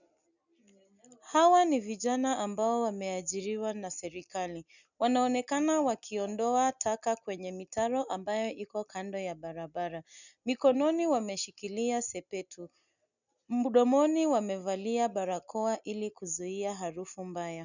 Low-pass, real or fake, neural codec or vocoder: 7.2 kHz; real; none